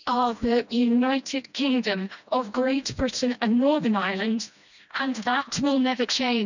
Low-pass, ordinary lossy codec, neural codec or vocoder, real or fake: 7.2 kHz; none; codec, 16 kHz, 1 kbps, FreqCodec, smaller model; fake